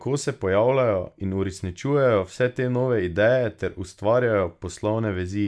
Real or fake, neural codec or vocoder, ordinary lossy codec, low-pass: real; none; none; none